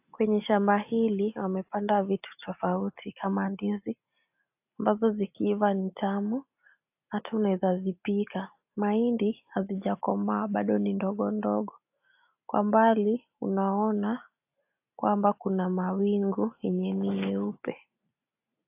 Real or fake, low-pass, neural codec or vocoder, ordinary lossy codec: real; 3.6 kHz; none; AAC, 32 kbps